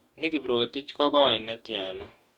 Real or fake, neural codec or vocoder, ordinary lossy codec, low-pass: fake; codec, 44.1 kHz, 2.6 kbps, DAC; Opus, 64 kbps; 19.8 kHz